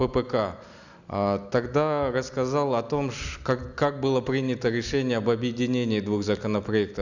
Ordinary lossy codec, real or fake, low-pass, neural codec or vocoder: none; real; 7.2 kHz; none